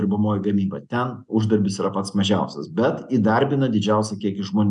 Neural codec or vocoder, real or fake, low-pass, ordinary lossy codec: none; real; 10.8 kHz; MP3, 96 kbps